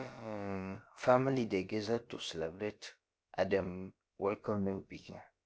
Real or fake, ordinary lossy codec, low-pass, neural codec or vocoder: fake; none; none; codec, 16 kHz, about 1 kbps, DyCAST, with the encoder's durations